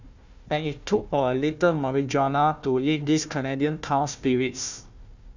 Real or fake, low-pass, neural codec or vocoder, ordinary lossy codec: fake; 7.2 kHz; codec, 16 kHz, 1 kbps, FunCodec, trained on Chinese and English, 50 frames a second; none